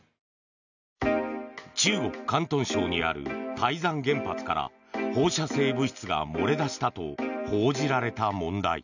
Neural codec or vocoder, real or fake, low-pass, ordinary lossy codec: none; real; 7.2 kHz; none